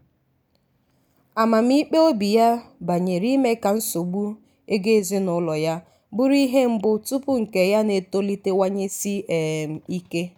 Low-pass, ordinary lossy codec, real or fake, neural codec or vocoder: none; none; real; none